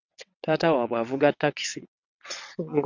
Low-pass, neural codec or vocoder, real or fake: 7.2 kHz; vocoder, 22.05 kHz, 80 mel bands, WaveNeXt; fake